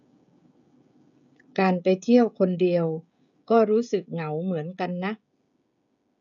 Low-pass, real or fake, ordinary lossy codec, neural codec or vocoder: 7.2 kHz; fake; none; codec, 16 kHz, 16 kbps, FreqCodec, smaller model